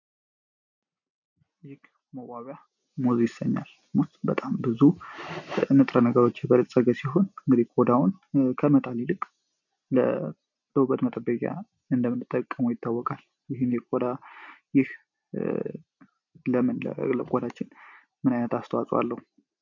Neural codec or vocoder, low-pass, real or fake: none; 7.2 kHz; real